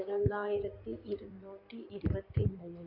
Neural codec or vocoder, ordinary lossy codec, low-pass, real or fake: codec, 16 kHz in and 24 kHz out, 2.2 kbps, FireRedTTS-2 codec; none; 5.4 kHz; fake